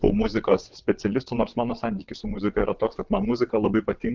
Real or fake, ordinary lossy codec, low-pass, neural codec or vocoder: fake; Opus, 32 kbps; 7.2 kHz; vocoder, 22.05 kHz, 80 mel bands, WaveNeXt